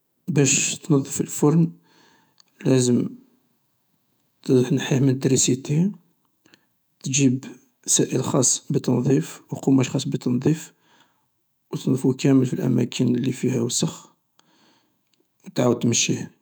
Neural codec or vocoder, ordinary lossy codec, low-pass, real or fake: autoencoder, 48 kHz, 128 numbers a frame, DAC-VAE, trained on Japanese speech; none; none; fake